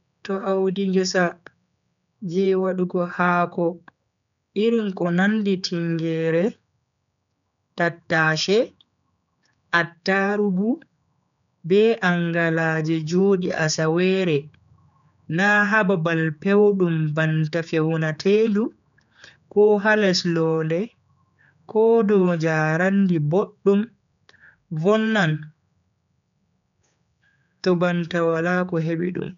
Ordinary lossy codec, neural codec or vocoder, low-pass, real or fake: none; codec, 16 kHz, 4 kbps, X-Codec, HuBERT features, trained on general audio; 7.2 kHz; fake